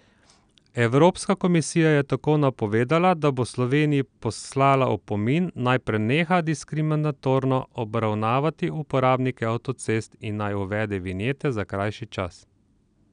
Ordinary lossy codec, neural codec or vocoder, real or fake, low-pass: none; none; real; 9.9 kHz